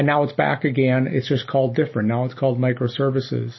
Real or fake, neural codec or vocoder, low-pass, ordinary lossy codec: real; none; 7.2 kHz; MP3, 24 kbps